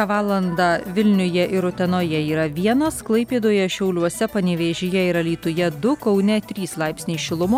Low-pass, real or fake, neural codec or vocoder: 19.8 kHz; real; none